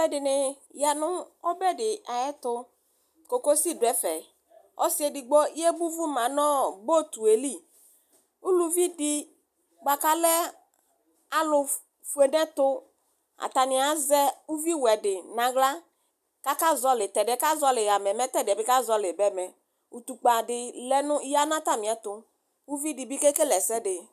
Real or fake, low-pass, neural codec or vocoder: real; 14.4 kHz; none